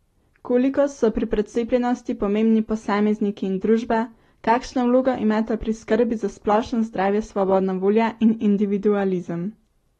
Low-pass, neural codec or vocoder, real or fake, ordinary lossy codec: 19.8 kHz; none; real; AAC, 32 kbps